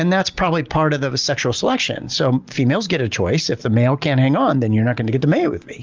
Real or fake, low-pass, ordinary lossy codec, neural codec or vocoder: real; 7.2 kHz; Opus, 32 kbps; none